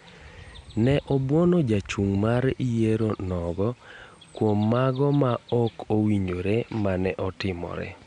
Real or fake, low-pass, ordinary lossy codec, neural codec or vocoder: real; 9.9 kHz; none; none